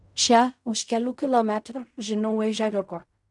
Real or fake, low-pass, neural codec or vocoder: fake; 10.8 kHz; codec, 16 kHz in and 24 kHz out, 0.4 kbps, LongCat-Audio-Codec, fine tuned four codebook decoder